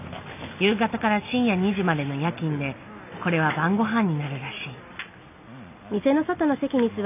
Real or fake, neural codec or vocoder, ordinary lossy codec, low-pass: real; none; none; 3.6 kHz